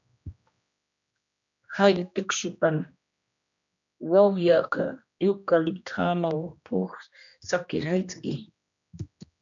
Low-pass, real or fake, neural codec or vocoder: 7.2 kHz; fake; codec, 16 kHz, 1 kbps, X-Codec, HuBERT features, trained on general audio